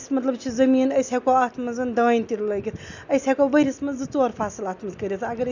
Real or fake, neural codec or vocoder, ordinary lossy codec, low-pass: real; none; none; 7.2 kHz